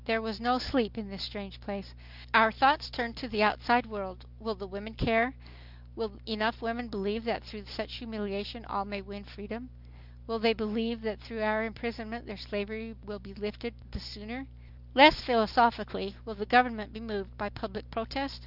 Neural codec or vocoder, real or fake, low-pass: none; real; 5.4 kHz